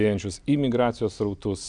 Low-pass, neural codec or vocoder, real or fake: 9.9 kHz; none; real